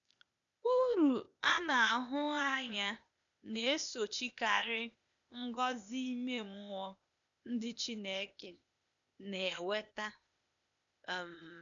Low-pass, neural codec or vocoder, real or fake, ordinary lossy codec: 7.2 kHz; codec, 16 kHz, 0.8 kbps, ZipCodec; fake; none